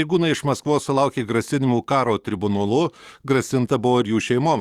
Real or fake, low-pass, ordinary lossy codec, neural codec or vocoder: fake; 19.8 kHz; Opus, 64 kbps; codec, 44.1 kHz, 7.8 kbps, DAC